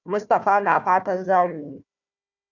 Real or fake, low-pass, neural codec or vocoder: fake; 7.2 kHz; codec, 16 kHz, 1 kbps, FunCodec, trained on Chinese and English, 50 frames a second